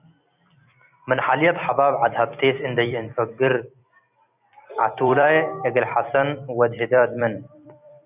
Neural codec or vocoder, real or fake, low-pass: vocoder, 44.1 kHz, 128 mel bands every 256 samples, BigVGAN v2; fake; 3.6 kHz